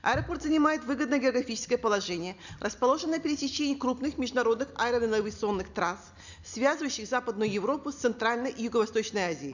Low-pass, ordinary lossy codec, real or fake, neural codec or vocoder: 7.2 kHz; none; real; none